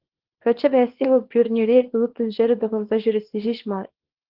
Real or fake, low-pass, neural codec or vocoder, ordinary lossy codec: fake; 5.4 kHz; codec, 24 kHz, 0.9 kbps, WavTokenizer, small release; Opus, 16 kbps